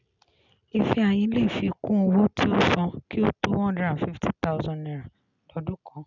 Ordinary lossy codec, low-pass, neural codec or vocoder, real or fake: none; 7.2 kHz; none; real